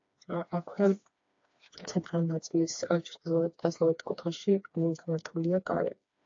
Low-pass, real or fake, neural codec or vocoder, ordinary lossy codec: 7.2 kHz; fake; codec, 16 kHz, 2 kbps, FreqCodec, smaller model; AAC, 48 kbps